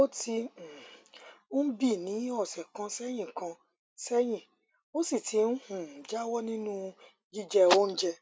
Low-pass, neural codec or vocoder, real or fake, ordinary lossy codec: none; none; real; none